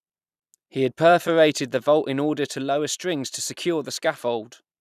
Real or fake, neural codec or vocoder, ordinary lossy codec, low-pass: fake; vocoder, 44.1 kHz, 128 mel bands every 256 samples, BigVGAN v2; none; 14.4 kHz